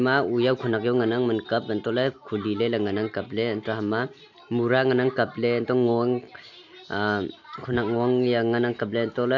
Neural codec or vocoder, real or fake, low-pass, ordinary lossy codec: none; real; 7.2 kHz; AAC, 48 kbps